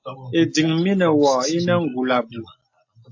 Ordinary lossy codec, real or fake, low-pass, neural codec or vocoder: AAC, 48 kbps; real; 7.2 kHz; none